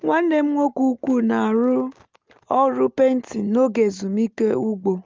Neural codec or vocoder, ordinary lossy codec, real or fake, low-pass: none; Opus, 32 kbps; real; 7.2 kHz